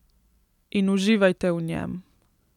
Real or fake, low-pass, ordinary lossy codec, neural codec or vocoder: real; 19.8 kHz; none; none